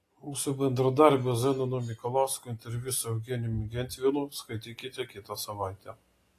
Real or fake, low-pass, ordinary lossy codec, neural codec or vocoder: real; 14.4 kHz; AAC, 48 kbps; none